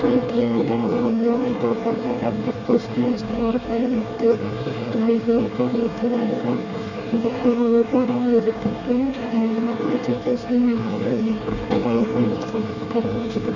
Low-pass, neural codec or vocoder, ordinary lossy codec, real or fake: 7.2 kHz; codec, 24 kHz, 1 kbps, SNAC; none; fake